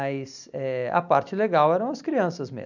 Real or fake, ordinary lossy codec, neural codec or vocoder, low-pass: real; none; none; 7.2 kHz